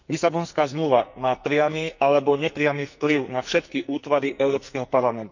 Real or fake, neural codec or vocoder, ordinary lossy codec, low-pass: fake; codec, 44.1 kHz, 2.6 kbps, SNAC; none; 7.2 kHz